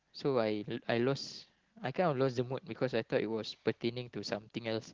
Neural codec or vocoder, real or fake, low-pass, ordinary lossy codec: none; real; 7.2 kHz; Opus, 16 kbps